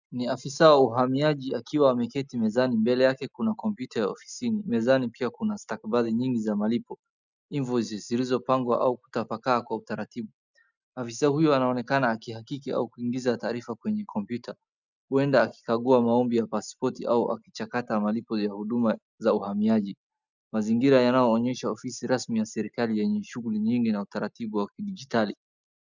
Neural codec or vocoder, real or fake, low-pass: none; real; 7.2 kHz